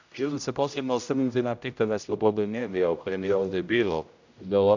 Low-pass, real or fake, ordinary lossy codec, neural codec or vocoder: 7.2 kHz; fake; none; codec, 16 kHz, 0.5 kbps, X-Codec, HuBERT features, trained on general audio